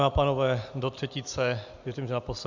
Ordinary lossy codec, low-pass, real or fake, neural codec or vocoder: Opus, 64 kbps; 7.2 kHz; real; none